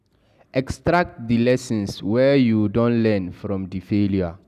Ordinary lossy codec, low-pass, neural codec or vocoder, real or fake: none; 14.4 kHz; none; real